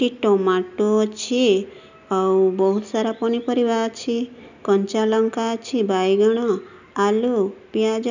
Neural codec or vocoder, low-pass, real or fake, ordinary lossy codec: none; 7.2 kHz; real; none